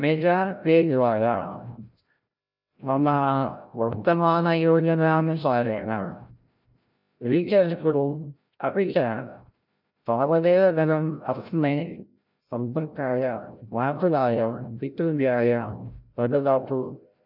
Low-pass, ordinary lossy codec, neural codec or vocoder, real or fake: 5.4 kHz; none; codec, 16 kHz, 0.5 kbps, FreqCodec, larger model; fake